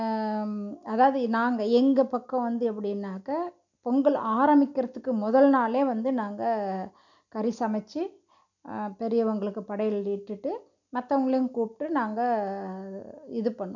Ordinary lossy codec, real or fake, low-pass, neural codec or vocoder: none; real; 7.2 kHz; none